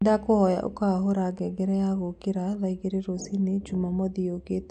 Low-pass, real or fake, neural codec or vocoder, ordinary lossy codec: 9.9 kHz; real; none; none